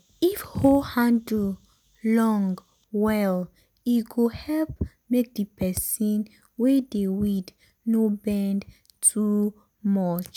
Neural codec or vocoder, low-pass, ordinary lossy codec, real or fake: none; none; none; real